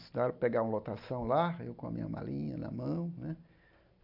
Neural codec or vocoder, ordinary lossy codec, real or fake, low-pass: none; AAC, 48 kbps; real; 5.4 kHz